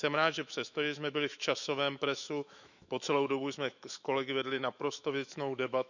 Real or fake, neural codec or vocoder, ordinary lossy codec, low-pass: fake; autoencoder, 48 kHz, 128 numbers a frame, DAC-VAE, trained on Japanese speech; none; 7.2 kHz